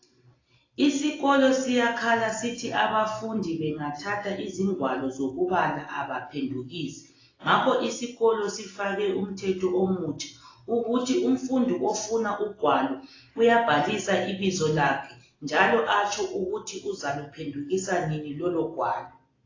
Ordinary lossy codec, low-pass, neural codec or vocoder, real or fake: AAC, 32 kbps; 7.2 kHz; none; real